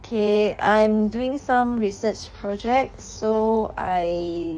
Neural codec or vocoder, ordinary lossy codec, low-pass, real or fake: codec, 16 kHz in and 24 kHz out, 1.1 kbps, FireRedTTS-2 codec; none; 9.9 kHz; fake